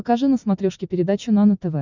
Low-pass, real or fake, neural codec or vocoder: 7.2 kHz; real; none